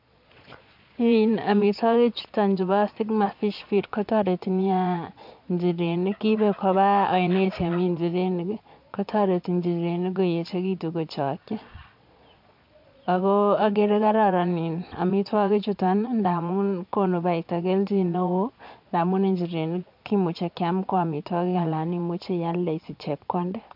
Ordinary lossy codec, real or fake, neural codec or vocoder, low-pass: MP3, 48 kbps; fake; vocoder, 22.05 kHz, 80 mel bands, Vocos; 5.4 kHz